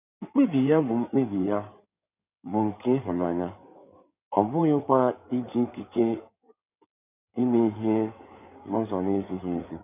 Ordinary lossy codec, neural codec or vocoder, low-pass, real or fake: none; codec, 16 kHz in and 24 kHz out, 2.2 kbps, FireRedTTS-2 codec; 3.6 kHz; fake